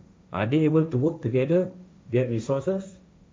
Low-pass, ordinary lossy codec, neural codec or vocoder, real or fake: none; none; codec, 16 kHz, 1.1 kbps, Voila-Tokenizer; fake